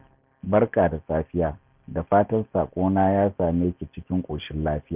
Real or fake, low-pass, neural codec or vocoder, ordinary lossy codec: real; 3.6 kHz; none; Opus, 24 kbps